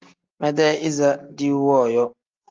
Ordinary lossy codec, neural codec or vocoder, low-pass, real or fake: Opus, 24 kbps; none; 7.2 kHz; real